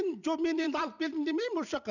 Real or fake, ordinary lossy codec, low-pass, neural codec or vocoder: fake; none; 7.2 kHz; vocoder, 44.1 kHz, 128 mel bands every 256 samples, BigVGAN v2